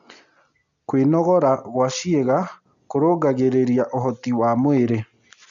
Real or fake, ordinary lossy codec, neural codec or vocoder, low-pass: real; none; none; 7.2 kHz